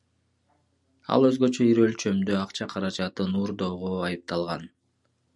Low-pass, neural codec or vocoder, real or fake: 10.8 kHz; none; real